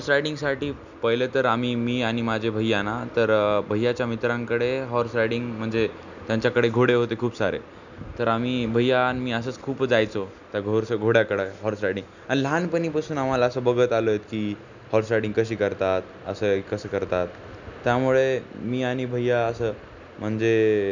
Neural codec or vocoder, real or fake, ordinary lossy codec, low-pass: none; real; none; 7.2 kHz